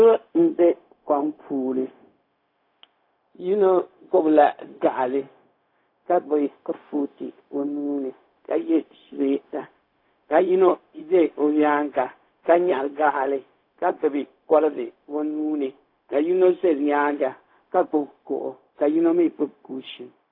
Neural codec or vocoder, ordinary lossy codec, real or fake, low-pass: codec, 16 kHz, 0.4 kbps, LongCat-Audio-Codec; AAC, 32 kbps; fake; 5.4 kHz